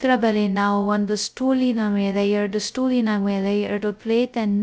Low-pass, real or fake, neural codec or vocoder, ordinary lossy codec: none; fake; codec, 16 kHz, 0.2 kbps, FocalCodec; none